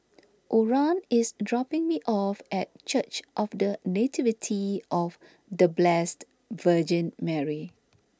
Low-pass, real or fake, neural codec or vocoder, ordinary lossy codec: none; real; none; none